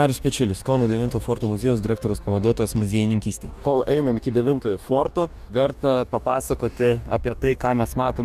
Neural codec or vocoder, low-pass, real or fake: codec, 44.1 kHz, 2.6 kbps, DAC; 14.4 kHz; fake